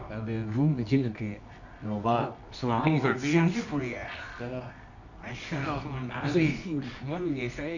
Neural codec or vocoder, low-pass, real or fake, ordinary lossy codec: codec, 24 kHz, 0.9 kbps, WavTokenizer, medium music audio release; 7.2 kHz; fake; none